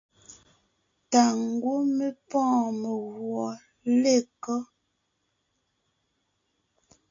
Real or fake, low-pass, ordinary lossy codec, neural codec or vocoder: real; 7.2 kHz; AAC, 48 kbps; none